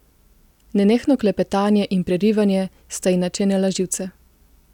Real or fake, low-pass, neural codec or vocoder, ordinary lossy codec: real; 19.8 kHz; none; none